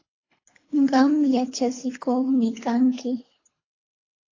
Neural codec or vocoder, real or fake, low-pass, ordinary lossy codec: codec, 24 kHz, 3 kbps, HILCodec; fake; 7.2 kHz; AAC, 32 kbps